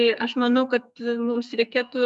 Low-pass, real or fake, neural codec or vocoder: 10.8 kHz; fake; codec, 44.1 kHz, 2.6 kbps, SNAC